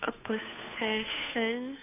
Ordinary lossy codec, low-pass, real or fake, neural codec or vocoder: none; 3.6 kHz; fake; codec, 16 kHz, 2 kbps, FunCodec, trained on Chinese and English, 25 frames a second